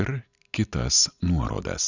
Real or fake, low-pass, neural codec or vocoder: real; 7.2 kHz; none